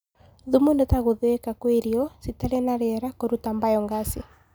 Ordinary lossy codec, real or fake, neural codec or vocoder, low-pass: none; real; none; none